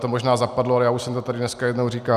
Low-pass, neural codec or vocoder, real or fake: 14.4 kHz; none; real